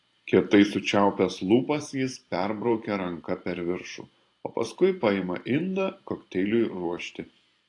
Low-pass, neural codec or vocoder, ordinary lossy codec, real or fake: 10.8 kHz; vocoder, 44.1 kHz, 128 mel bands every 512 samples, BigVGAN v2; AAC, 48 kbps; fake